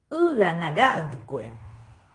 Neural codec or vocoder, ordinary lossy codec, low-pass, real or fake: codec, 16 kHz in and 24 kHz out, 0.9 kbps, LongCat-Audio-Codec, fine tuned four codebook decoder; Opus, 32 kbps; 10.8 kHz; fake